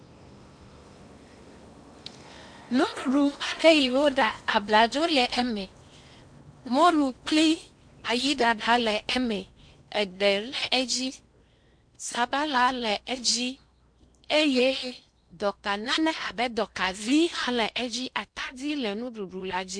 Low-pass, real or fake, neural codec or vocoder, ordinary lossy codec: 9.9 kHz; fake; codec, 16 kHz in and 24 kHz out, 0.8 kbps, FocalCodec, streaming, 65536 codes; MP3, 64 kbps